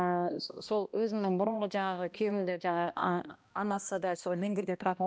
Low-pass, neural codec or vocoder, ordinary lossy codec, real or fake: none; codec, 16 kHz, 1 kbps, X-Codec, HuBERT features, trained on balanced general audio; none; fake